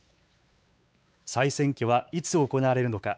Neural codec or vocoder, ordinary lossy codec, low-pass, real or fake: codec, 16 kHz, 4 kbps, X-Codec, WavLM features, trained on Multilingual LibriSpeech; none; none; fake